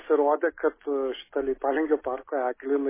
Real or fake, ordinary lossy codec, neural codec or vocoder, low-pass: real; MP3, 16 kbps; none; 3.6 kHz